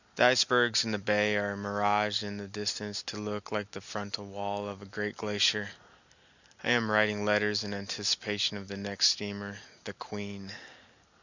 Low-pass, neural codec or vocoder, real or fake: 7.2 kHz; none; real